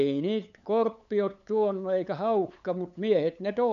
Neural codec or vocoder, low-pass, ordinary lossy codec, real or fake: codec, 16 kHz, 8 kbps, FunCodec, trained on LibriTTS, 25 frames a second; 7.2 kHz; none; fake